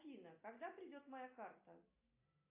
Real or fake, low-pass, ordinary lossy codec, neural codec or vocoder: real; 3.6 kHz; AAC, 24 kbps; none